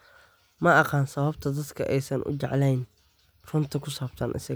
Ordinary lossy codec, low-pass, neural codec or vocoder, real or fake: none; none; none; real